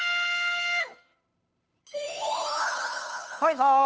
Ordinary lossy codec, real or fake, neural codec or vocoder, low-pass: none; fake; codec, 16 kHz, 2 kbps, FunCodec, trained on Chinese and English, 25 frames a second; none